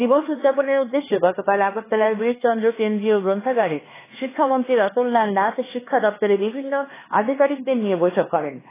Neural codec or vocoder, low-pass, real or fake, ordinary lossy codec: codec, 16 kHz, 4 kbps, X-Codec, HuBERT features, trained on LibriSpeech; 3.6 kHz; fake; AAC, 16 kbps